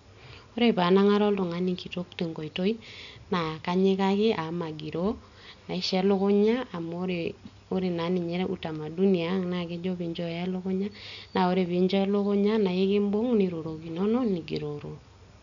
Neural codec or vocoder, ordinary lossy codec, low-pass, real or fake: none; none; 7.2 kHz; real